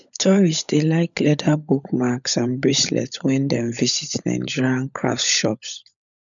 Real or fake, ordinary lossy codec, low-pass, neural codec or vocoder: fake; none; 7.2 kHz; codec, 16 kHz, 16 kbps, FunCodec, trained on LibriTTS, 50 frames a second